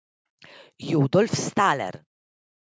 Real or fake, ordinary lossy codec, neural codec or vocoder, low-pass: real; none; none; none